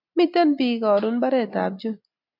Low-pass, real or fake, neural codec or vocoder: 5.4 kHz; real; none